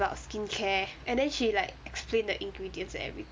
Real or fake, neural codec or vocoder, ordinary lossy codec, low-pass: real; none; none; none